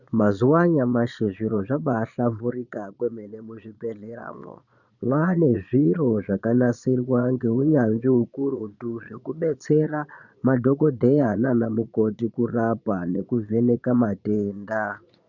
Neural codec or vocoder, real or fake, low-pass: vocoder, 22.05 kHz, 80 mel bands, Vocos; fake; 7.2 kHz